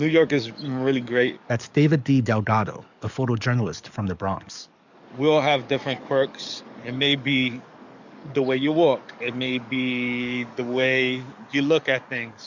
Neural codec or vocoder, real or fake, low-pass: codec, 44.1 kHz, 7.8 kbps, DAC; fake; 7.2 kHz